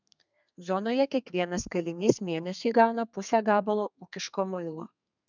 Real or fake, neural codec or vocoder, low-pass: fake; codec, 32 kHz, 1.9 kbps, SNAC; 7.2 kHz